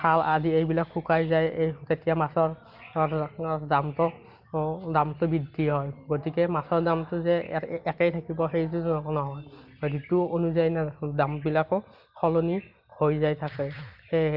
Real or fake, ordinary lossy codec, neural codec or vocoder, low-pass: real; Opus, 32 kbps; none; 5.4 kHz